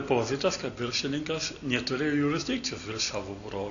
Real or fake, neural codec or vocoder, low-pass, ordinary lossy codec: real; none; 7.2 kHz; MP3, 96 kbps